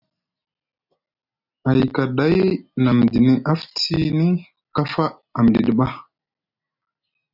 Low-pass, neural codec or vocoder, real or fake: 5.4 kHz; none; real